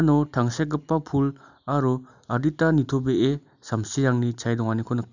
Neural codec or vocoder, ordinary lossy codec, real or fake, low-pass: none; none; real; 7.2 kHz